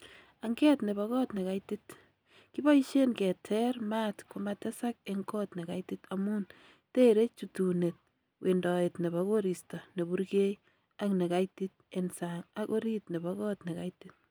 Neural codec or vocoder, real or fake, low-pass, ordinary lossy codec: none; real; none; none